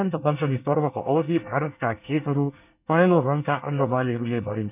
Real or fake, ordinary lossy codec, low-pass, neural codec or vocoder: fake; none; 3.6 kHz; codec, 24 kHz, 1 kbps, SNAC